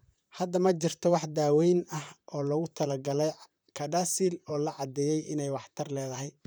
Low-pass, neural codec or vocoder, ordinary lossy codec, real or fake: none; vocoder, 44.1 kHz, 128 mel bands, Pupu-Vocoder; none; fake